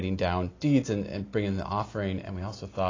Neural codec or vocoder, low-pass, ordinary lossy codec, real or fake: none; 7.2 kHz; AAC, 32 kbps; real